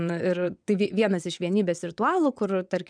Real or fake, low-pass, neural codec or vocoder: fake; 9.9 kHz; vocoder, 22.05 kHz, 80 mel bands, WaveNeXt